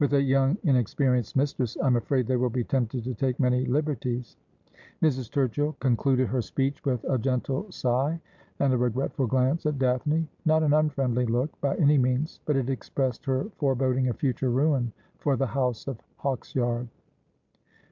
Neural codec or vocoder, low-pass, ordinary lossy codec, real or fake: none; 7.2 kHz; MP3, 64 kbps; real